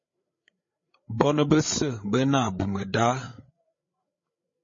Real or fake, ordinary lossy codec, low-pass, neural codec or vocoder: fake; MP3, 32 kbps; 7.2 kHz; codec, 16 kHz, 8 kbps, FreqCodec, larger model